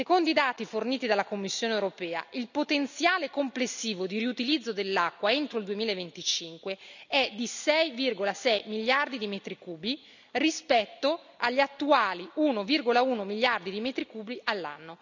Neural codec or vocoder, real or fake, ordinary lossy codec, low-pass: none; real; none; 7.2 kHz